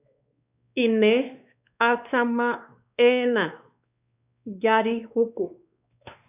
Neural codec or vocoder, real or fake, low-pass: codec, 16 kHz, 2 kbps, X-Codec, WavLM features, trained on Multilingual LibriSpeech; fake; 3.6 kHz